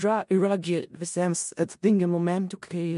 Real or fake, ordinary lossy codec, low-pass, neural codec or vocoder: fake; MP3, 64 kbps; 10.8 kHz; codec, 16 kHz in and 24 kHz out, 0.4 kbps, LongCat-Audio-Codec, four codebook decoder